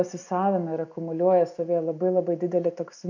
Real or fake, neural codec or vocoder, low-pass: real; none; 7.2 kHz